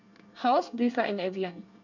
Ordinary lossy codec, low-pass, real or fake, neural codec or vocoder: none; 7.2 kHz; fake; codec, 24 kHz, 1 kbps, SNAC